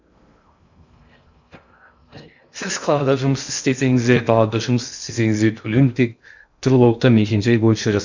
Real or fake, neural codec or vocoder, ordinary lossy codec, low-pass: fake; codec, 16 kHz in and 24 kHz out, 0.6 kbps, FocalCodec, streaming, 2048 codes; none; 7.2 kHz